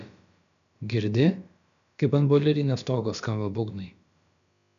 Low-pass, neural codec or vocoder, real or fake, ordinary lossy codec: 7.2 kHz; codec, 16 kHz, about 1 kbps, DyCAST, with the encoder's durations; fake; MP3, 96 kbps